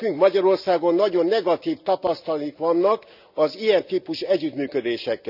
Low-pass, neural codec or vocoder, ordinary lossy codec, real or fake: 5.4 kHz; none; none; real